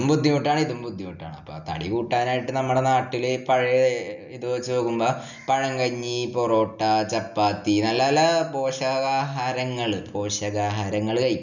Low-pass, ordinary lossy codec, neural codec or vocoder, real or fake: 7.2 kHz; none; none; real